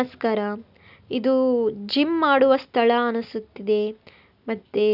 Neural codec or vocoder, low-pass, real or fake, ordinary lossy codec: none; 5.4 kHz; real; none